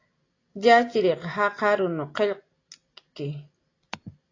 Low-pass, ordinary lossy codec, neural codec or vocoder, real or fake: 7.2 kHz; AAC, 32 kbps; none; real